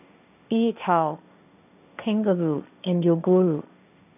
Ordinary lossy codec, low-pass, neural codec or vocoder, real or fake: none; 3.6 kHz; codec, 16 kHz, 1.1 kbps, Voila-Tokenizer; fake